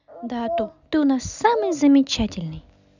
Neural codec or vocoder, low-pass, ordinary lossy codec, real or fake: none; 7.2 kHz; none; real